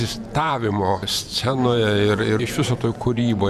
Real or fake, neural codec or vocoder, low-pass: fake; vocoder, 48 kHz, 128 mel bands, Vocos; 14.4 kHz